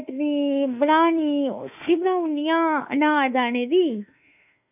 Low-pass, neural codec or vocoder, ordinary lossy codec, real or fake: 3.6 kHz; autoencoder, 48 kHz, 32 numbers a frame, DAC-VAE, trained on Japanese speech; none; fake